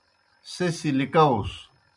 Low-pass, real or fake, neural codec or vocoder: 10.8 kHz; real; none